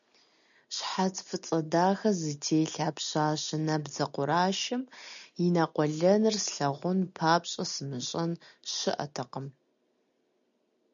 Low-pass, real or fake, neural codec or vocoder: 7.2 kHz; real; none